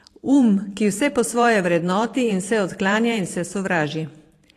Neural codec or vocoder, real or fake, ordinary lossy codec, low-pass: vocoder, 44.1 kHz, 128 mel bands every 512 samples, BigVGAN v2; fake; AAC, 48 kbps; 14.4 kHz